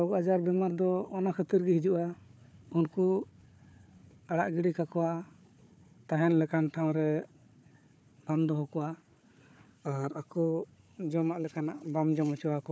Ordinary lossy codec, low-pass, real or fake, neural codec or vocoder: none; none; fake; codec, 16 kHz, 4 kbps, FunCodec, trained on Chinese and English, 50 frames a second